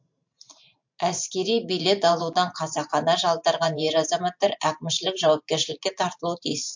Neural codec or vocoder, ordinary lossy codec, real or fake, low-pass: vocoder, 44.1 kHz, 128 mel bands every 512 samples, BigVGAN v2; MP3, 48 kbps; fake; 7.2 kHz